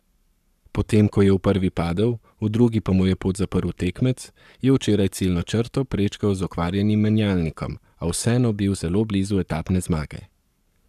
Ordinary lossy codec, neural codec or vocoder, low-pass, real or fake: none; codec, 44.1 kHz, 7.8 kbps, Pupu-Codec; 14.4 kHz; fake